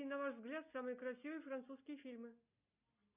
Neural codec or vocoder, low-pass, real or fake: none; 3.6 kHz; real